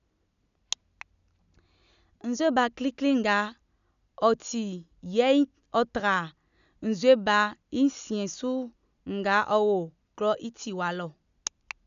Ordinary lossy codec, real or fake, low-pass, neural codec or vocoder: none; real; 7.2 kHz; none